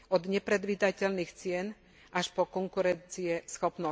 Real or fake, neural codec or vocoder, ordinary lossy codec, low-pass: real; none; none; none